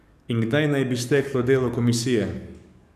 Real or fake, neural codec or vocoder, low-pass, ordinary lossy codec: fake; codec, 44.1 kHz, 7.8 kbps, DAC; 14.4 kHz; none